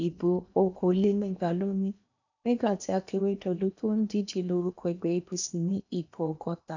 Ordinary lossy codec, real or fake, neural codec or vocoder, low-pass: none; fake; codec, 16 kHz in and 24 kHz out, 0.8 kbps, FocalCodec, streaming, 65536 codes; 7.2 kHz